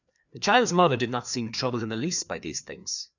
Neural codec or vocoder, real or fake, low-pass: codec, 16 kHz, 2 kbps, FreqCodec, larger model; fake; 7.2 kHz